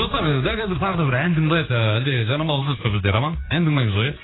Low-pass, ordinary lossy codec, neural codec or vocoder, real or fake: 7.2 kHz; AAC, 16 kbps; codec, 16 kHz, 4 kbps, X-Codec, HuBERT features, trained on general audio; fake